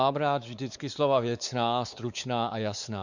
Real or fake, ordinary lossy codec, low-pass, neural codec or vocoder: fake; Opus, 64 kbps; 7.2 kHz; codec, 16 kHz, 4 kbps, X-Codec, WavLM features, trained on Multilingual LibriSpeech